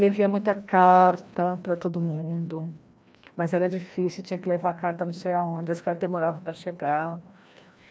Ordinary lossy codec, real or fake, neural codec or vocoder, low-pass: none; fake; codec, 16 kHz, 1 kbps, FreqCodec, larger model; none